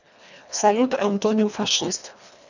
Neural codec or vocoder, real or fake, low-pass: codec, 24 kHz, 1.5 kbps, HILCodec; fake; 7.2 kHz